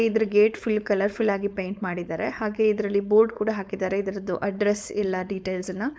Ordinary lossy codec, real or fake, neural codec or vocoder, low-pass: none; fake; codec, 16 kHz, 4.8 kbps, FACodec; none